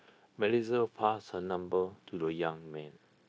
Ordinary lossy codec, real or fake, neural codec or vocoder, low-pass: none; fake; codec, 16 kHz, 0.9 kbps, LongCat-Audio-Codec; none